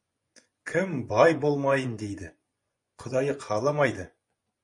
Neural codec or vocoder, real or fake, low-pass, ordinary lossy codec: vocoder, 24 kHz, 100 mel bands, Vocos; fake; 10.8 kHz; MP3, 48 kbps